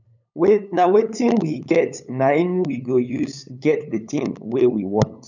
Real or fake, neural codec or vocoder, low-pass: fake; codec, 16 kHz, 8 kbps, FunCodec, trained on LibriTTS, 25 frames a second; 7.2 kHz